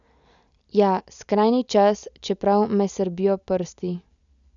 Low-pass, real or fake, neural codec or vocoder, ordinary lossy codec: 7.2 kHz; real; none; none